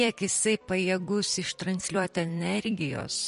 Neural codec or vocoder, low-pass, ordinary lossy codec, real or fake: vocoder, 44.1 kHz, 128 mel bands every 256 samples, BigVGAN v2; 14.4 kHz; MP3, 48 kbps; fake